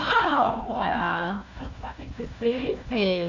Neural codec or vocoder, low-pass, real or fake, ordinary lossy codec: codec, 16 kHz, 1 kbps, FunCodec, trained on Chinese and English, 50 frames a second; 7.2 kHz; fake; none